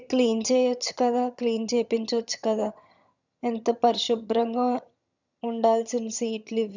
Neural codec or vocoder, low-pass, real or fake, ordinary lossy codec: vocoder, 22.05 kHz, 80 mel bands, HiFi-GAN; 7.2 kHz; fake; none